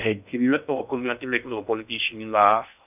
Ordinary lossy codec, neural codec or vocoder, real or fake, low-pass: none; codec, 16 kHz in and 24 kHz out, 0.6 kbps, FocalCodec, streaming, 2048 codes; fake; 3.6 kHz